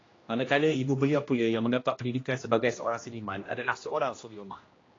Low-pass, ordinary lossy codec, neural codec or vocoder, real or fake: 7.2 kHz; AAC, 32 kbps; codec, 16 kHz, 1 kbps, X-Codec, HuBERT features, trained on general audio; fake